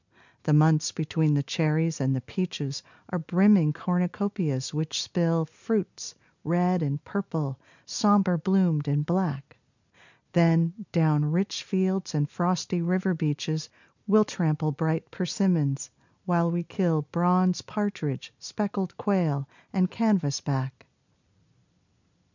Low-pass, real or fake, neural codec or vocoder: 7.2 kHz; real; none